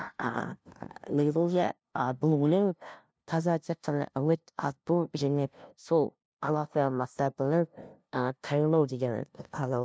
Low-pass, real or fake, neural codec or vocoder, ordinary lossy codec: none; fake; codec, 16 kHz, 0.5 kbps, FunCodec, trained on LibriTTS, 25 frames a second; none